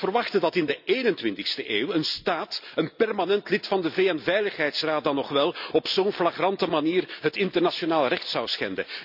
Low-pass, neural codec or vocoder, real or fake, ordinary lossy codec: 5.4 kHz; none; real; none